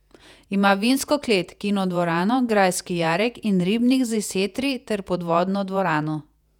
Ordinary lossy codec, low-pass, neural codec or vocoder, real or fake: none; 19.8 kHz; vocoder, 48 kHz, 128 mel bands, Vocos; fake